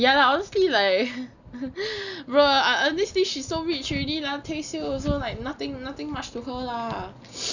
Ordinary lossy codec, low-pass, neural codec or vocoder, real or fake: none; 7.2 kHz; none; real